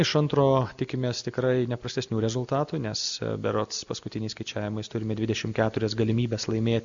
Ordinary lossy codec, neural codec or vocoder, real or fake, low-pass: Opus, 64 kbps; none; real; 7.2 kHz